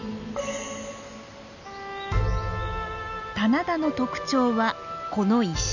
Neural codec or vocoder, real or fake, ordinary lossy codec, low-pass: none; real; none; 7.2 kHz